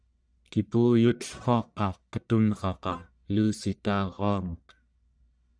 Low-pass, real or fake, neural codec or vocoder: 9.9 kHz; fake; codec, 44.1 kHz, 1.7 kbps, Pupu-Codec